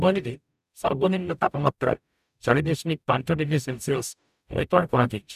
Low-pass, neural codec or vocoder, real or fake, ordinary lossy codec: 14.4 kHz; codec, 44.1 kHz, 0.9 kbps, DAC; fake; none